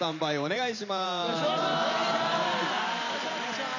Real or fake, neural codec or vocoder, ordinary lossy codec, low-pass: fake; vocoder, 44.1 kHz, 128 mel bands every 512 samples, BigVGAN v2; none; 7.2 kHz